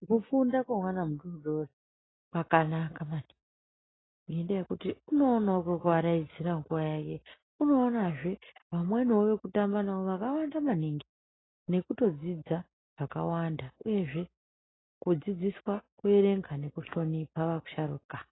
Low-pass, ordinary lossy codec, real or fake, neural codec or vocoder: 7.2 kHz; AAC, 16 kbps; real; none